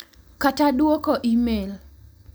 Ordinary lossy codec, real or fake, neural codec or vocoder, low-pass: none; real; none; none